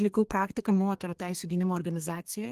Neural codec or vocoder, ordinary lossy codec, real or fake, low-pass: codec, 32 kHz, 1.9 kbps, SNAC; Opus, 24 kbps; fake; 14.4 kHz